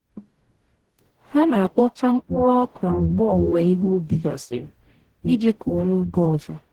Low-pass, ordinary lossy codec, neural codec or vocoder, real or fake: 19.8 kHz; Opus, 16 kbps; codec, 44.1 kHz, 0.9 kbps, DAC; fake